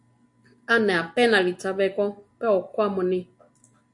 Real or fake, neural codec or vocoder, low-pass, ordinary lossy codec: real; none; 10.8 kHz; AAC, 64 kbps